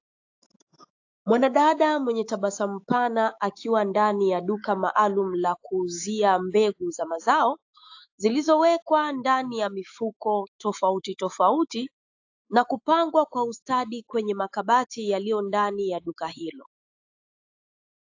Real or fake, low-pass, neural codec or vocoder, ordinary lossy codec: fake; 7.2 kHz; autoencoder, 48 kHz, 128 numbers a frame, DAC-VAE, trained on Japanese speech; AAC, 48 kbps